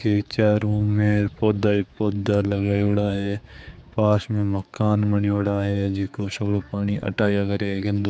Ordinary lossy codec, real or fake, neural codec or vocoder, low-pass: none; fake; codec, 16 kHz, 4 kbps, X-Codec, HuBERT features, trained on general audio; none